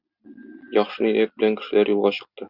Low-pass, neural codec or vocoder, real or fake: 5.4 kHz; none; real